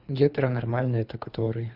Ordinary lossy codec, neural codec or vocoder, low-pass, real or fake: Opus, 64 kbps; codec, 24 kHz, 3 kbps, HILCodec; 5.4 kHz; fake